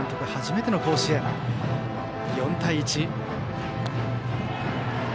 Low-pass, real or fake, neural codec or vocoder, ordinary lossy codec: none; real; none; none